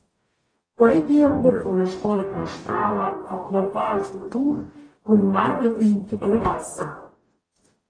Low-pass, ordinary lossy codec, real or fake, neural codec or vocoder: 9.9 kHz; AAC, 32 kbps; fake; codec, 44.1 kHz, 0.9 kbps, DAC